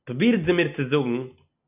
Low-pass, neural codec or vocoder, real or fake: 3.6 kHz; none; real